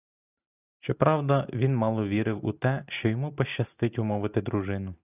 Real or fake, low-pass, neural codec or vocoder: real; 3.6 kHz; none